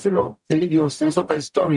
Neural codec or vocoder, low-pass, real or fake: codec, 44.1 kHz, 0.9 kbps, DAC; 10.8 kHz; fake